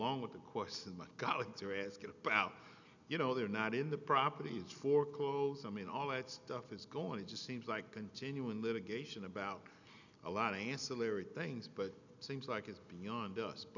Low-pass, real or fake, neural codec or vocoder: 7.2 kHz; real; none